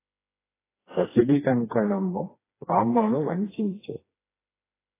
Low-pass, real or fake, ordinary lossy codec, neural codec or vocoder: 3.6 kHz; fake; AAC, 16 kbps; codec, 16 kHz, 2 kbps, FreqCodec, smaller model